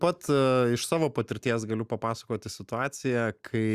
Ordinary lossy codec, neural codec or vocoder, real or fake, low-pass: Opus, 64 kbps; none; real; 14.4 kHz